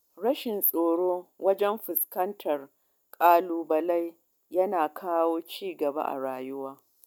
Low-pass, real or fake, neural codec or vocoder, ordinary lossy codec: none; real; none; none